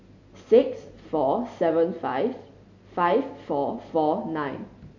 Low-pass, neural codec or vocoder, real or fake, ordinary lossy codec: 7.2 kHz; none; real; none